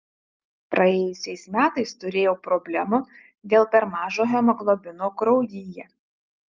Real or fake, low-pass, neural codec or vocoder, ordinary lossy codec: real; 7.2 kHz; none; Opus, 32 kbps